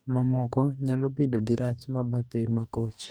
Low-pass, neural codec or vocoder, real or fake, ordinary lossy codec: none; codec, 44.1 kHz, 2.6 kbps, DAC; fake; none